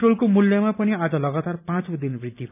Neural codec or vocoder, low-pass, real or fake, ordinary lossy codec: none; 3.6 kHz; real; none